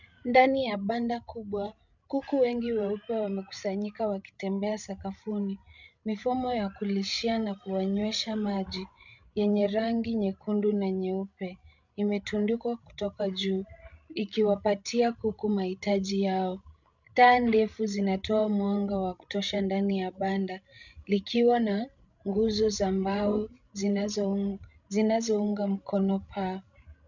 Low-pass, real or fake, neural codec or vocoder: 7.2 kHz; fake; codec, 16 kHz, 16 kbps, FreqCodec, larger model